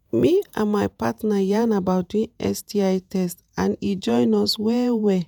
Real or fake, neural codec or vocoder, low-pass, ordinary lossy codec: fake; vocoder, 48 kHz, 128 mel bands, Vocos; none; none